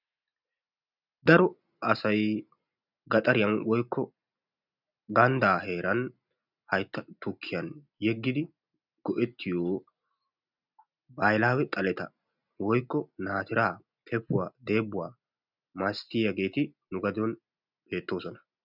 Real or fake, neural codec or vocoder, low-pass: real; none; 5.4 kHz